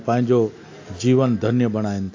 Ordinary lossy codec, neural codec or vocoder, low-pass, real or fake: AAC, 48 kbps; none; 7.2 kHz; real